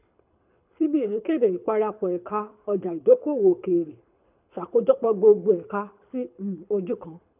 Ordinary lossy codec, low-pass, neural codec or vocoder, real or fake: none; 3.6 kHz; codec, 24 kHz, 6 kbps, HILCodec; fake